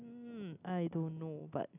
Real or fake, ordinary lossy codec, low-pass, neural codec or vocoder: real; none; 3.6 kHz; none